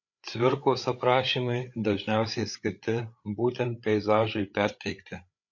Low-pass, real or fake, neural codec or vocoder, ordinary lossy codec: 7.2 kHz; fake; codec, 16 kHz, 8 kbps, FreqCodec, larger model; MP3, 48 kbps